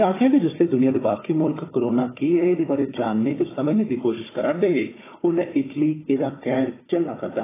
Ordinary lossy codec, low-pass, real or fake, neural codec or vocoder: AAC, 16 kbps; 3.6 kHz; fake; codec, 16 kHz, 4 kbps, FunCodec, trained on Chinese and English, 50 frames a second